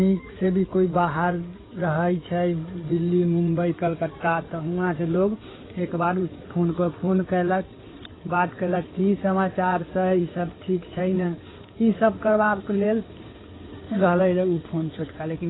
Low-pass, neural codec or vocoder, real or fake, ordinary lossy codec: 7.2 kHz; codec, 16 kHz in and 24 kHz out, 2.2 kbps, FireRedTTS-2 codec; fake; AAC, 16 kbps